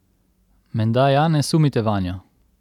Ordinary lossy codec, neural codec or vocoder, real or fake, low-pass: none; none; real; 19.8 kHz